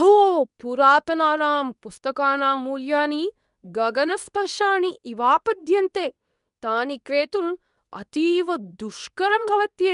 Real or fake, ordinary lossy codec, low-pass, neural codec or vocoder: fake; none; 10.8 kHz; codec, 24 kHz, 0.9 kbps, WavTokenizer, medium speech release version 2